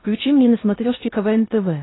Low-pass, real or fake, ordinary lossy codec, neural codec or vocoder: 7.2 kHz; fake; AAC, 16 kbps; codec, 16 kHz in and 24 kHz out, 0.6 kbps, FocalCodec, streaming, 4096 codes